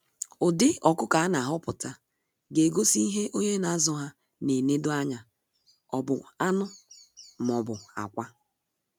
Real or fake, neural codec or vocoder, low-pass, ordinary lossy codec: real; none; none; none